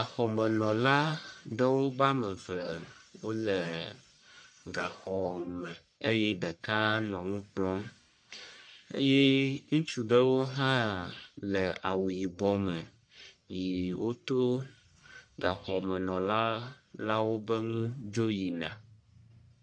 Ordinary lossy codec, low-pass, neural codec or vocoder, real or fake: MP3, 64 kbps; 9.9 kHz; codec, 44.1 kHz, 1.7 kbps, Pupu-Codec; fake